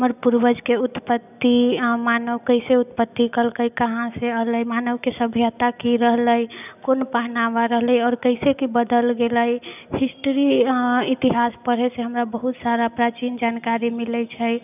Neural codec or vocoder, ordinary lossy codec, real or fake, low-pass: none; none; real; 3.6 kHz